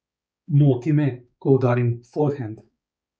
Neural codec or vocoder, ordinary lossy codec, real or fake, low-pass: codec, 16 kHz, 4 kbps, X-Codec, HuBERT features, trained on balanced general audio; none; fake; none